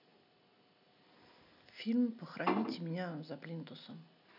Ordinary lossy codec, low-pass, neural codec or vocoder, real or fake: none; 5.4 kHz; none; real